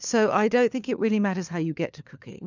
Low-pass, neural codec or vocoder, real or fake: 7.2 kHz; codec, 16 kHz, 4 kbps, FunCodec, trained on LibriTTS, 50 frames a second; fake